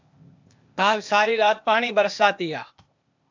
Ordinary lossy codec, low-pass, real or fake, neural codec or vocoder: MP3, 64 kbps; 7.2 kHz; fake; codec, 16 kHz, 0.8 kbps, ZipCodec